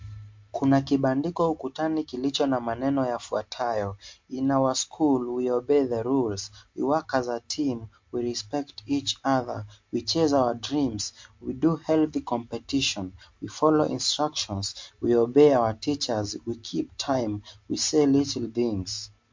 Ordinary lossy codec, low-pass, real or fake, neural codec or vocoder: MP3, 48 kbps; 7.2 kHz; real; none